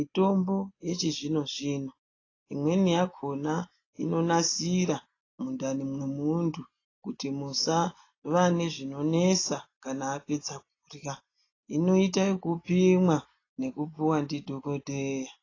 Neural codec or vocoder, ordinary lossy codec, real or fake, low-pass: none; AAC, 32 kbps; real; 7.2 kHz